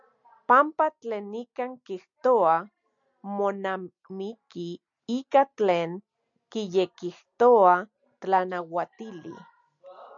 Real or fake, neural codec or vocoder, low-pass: real; none; 7.2 kHz